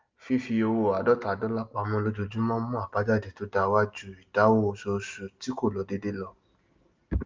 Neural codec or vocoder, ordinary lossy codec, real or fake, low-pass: none; Opus, 24 kbps; real; 7.2 kHz